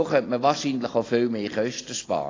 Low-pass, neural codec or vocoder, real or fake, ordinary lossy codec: 7.2 kHz; none; real; AAC, 32 kbps